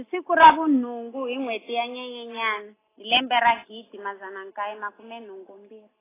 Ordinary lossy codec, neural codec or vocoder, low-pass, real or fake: AAC, 16 kbps; none; 3.6 kHz; real